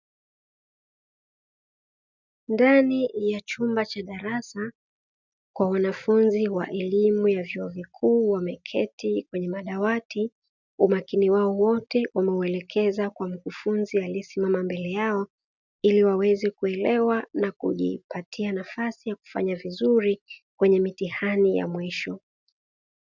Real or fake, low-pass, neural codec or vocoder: real; 7.2 kHz; none